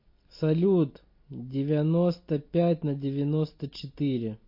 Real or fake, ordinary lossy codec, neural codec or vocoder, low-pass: real; MP3, 32 kbps; none; 5.4 kHz